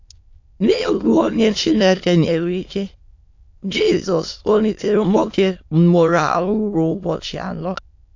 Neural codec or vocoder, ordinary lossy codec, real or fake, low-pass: autoencoder, 22.05 kHz, a latent of 192 numbers a frame, VITS, trained on many speakers; AAC, 48 kbps; fake; 7.2 kHz